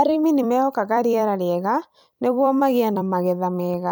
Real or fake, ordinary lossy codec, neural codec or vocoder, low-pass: fake; none; vocoder, 44.1 kHz, 128 mel bands every 256 samples, BigVGAN v2; none